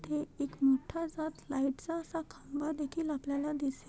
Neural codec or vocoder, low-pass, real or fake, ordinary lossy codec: none; none; real; none